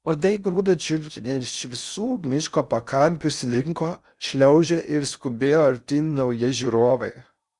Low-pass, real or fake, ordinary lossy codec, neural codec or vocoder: 10.8 kHz; fake; Opus, 64 kbps; codec, 16 kHz in and 24 kHz out, 0.6 kbps, FocalCodec, streaming, 4096 codes